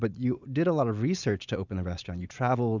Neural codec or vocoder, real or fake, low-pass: none; real; 7.2 kHz